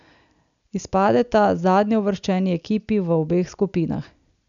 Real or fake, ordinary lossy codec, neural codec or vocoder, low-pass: real; none; none; 7.2 kHz